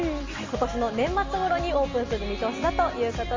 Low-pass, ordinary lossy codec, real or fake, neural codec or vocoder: 7.2 kHz; Opus, 32 kbps; real; none